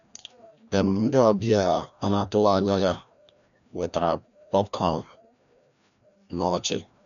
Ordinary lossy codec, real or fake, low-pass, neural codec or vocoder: none; fake; 7.2 kHz; codec, 16 kHz, 1 kbps, FreqCodec, larger model